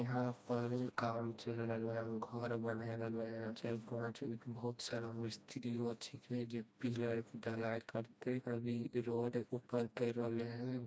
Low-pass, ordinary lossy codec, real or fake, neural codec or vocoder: none; none; fake; codec, 16 kHz, 1 kbps, FreqCodec, smaller model